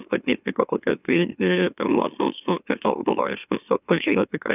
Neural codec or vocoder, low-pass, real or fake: autoencoder, 44.1 kHz, a latent of 192 numbers a frame, MeloTTS; 3.6 kHz; fake